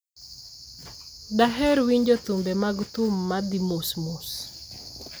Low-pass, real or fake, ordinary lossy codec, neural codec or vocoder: none; real; none; none